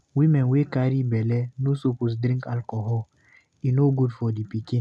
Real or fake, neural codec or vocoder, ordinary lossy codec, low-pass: real; none; none; none